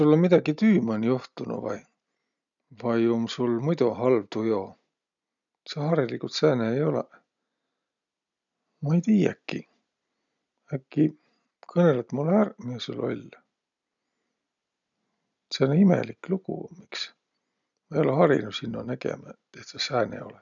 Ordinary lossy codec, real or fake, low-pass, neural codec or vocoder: none; real; 7.2 kHz; none